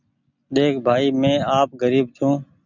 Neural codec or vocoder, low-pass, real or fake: none; 7.2 kHz; real